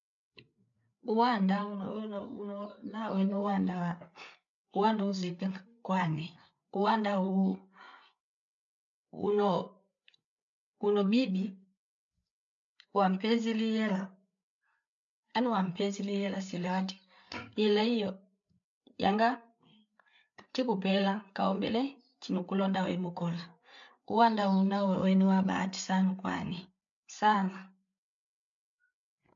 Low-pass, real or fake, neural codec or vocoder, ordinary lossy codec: 7.2 kHz; fake; codec, 16 kHz, 8 kbps, FreqCodec, larger model; MP3, 64 kbps